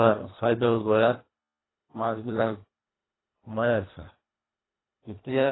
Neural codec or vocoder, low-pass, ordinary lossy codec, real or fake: codec, 24 kHz, 1.5 kbps, HILCodec; 7.2 kHz; AAC, 16 kbps; fake